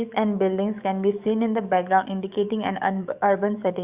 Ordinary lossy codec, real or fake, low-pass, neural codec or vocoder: Opus, 64 kbps; fake; 3.6 kHz; codec, 44.1 kHz, 7.8 kbps, DAC